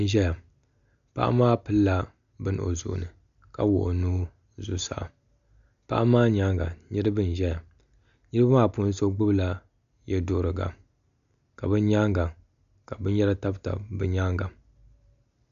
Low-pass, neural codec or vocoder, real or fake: 7.2 kHz; none; real